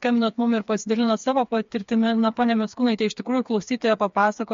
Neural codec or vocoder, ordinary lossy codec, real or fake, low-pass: codec, 16 kHz, 4 kbps, FreqCodec, smaller model; MP3, 48 kbps; fake; 7.2 kHz